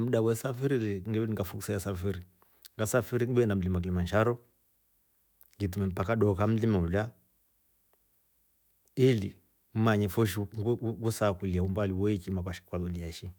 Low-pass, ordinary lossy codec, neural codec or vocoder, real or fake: none; none; autoencoder, 48 kHz, 128 numbers a frame, DAC-VAE, trained on Japanese speech; fake